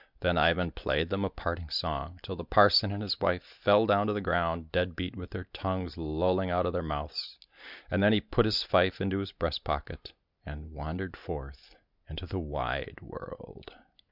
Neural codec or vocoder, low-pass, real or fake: none; 5.4 kHz; real